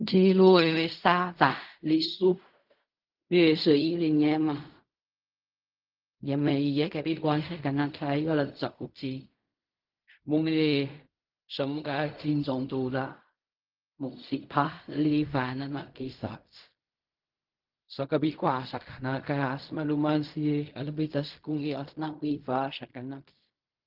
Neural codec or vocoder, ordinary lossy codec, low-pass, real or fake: codec, 16 kHz in and 24 kHz out, 0.4 kbps, LongCat-Audio-Codec, fine tuned four codebook decoder; Opus, 24 kbps; 5.4 kHz; fake